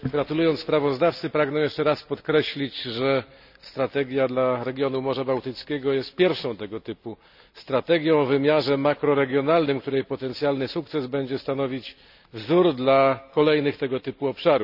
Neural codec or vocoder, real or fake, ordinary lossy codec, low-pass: none; real; none; 5.4 kHz